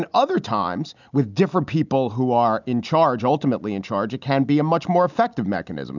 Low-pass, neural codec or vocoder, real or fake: 7.2 kHz; none; real